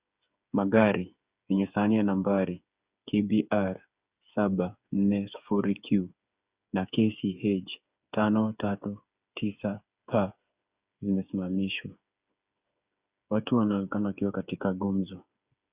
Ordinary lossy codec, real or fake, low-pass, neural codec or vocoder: Opus, 64 kbps; fake; 3.6 kHz; codec, 16 kHz, 8 kbps, FreqCodec, smaller model